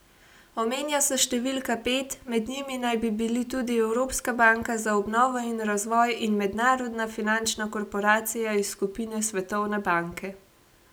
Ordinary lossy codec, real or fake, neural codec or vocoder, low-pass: none; real; none; none